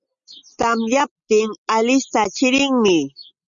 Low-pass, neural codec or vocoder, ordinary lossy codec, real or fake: 7.2 kHz; none; Opus, 64 kbps; real